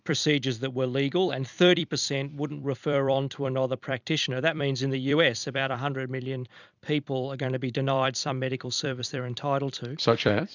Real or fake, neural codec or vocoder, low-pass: fake; vocoder, 44.1 kHz, 128 mel bands every 256 samples, BigVGAN v2; 7.2 kHz